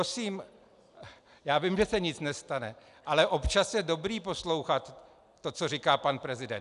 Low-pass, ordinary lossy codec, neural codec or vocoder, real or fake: 10.8 kHz; AAC, 96 kbps; none; real